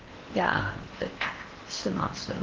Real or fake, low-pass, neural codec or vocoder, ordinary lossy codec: fake; 7.2 kHz; codec, 16 kHz in and 24 kHz out, 0.8 kbps, FocalCodec, streaming, 65536 codes; Opus, 16 kbps